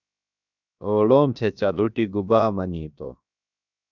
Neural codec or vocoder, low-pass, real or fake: codec, 16 kHz, 0.7 kbps, FocalCodec; 7.2 kHz; fake